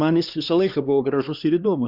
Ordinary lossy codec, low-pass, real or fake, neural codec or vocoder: Opus, 64 kbps; 5.4 kHz; fake; codec, 16 kHz, 2 kbps, X-Codec, HuBERT features, trained on LibriSpeech